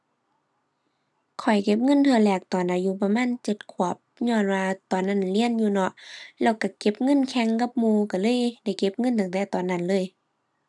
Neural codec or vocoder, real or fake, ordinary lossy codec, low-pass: none; real; none; none